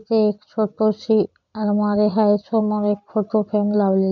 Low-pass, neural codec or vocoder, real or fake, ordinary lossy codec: 7.2 kHz; none; real; none